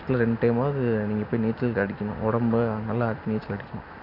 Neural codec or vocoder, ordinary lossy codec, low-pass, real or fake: none; none; 5.4 kHz; real